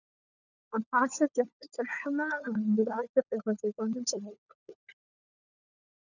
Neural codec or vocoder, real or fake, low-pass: codec, 16 kHz, 4 kbps, FunCodec, trained on LibriTTS, 50 frames a second; fake; 7.2 kHz